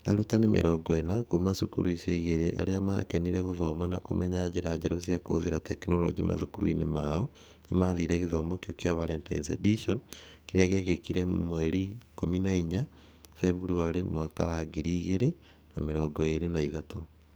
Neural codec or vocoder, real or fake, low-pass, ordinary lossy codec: codec, 44.1 kHz, 2.6 kbps, SNAC; fake; none; none